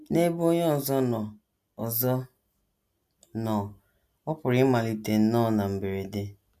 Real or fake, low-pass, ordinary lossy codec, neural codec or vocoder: real; 14.4 kHz; none; none